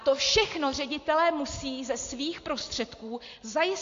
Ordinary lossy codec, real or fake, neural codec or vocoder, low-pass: AAC, 48 kbps; real; none; 7.2 kHz